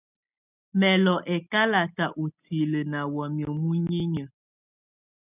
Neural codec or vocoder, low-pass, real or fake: none; 3.6 kHz; real